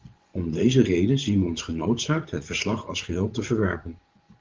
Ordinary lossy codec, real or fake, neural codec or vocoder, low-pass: Opus, 16 kbps; fake; vocoder, 24 kHz, 100 mel bands, Vocos; 7.2 kHz